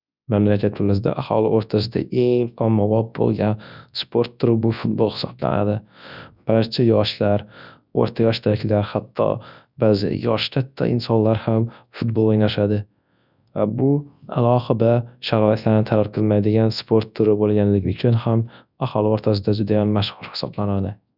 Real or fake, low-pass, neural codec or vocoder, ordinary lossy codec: fake; 5.4 kHz; codec, 24 kHz, 0.9 kbps, WavTokenizer, large speech release; none